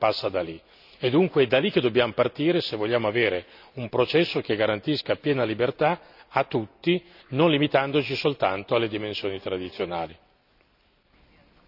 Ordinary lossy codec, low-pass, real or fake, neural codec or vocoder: none; 5.4 kHz; real; none